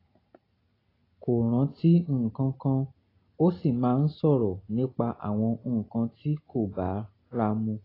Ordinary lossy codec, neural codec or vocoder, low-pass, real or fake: AAC, 24 kbps; none; 5.4 kHz; real